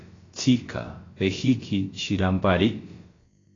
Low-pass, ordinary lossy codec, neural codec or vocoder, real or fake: 7.2 kHz; AAC, 32 kbps; codec, 16 kHz, about 1 kbps, DyCAST, with the encoder's durations; fake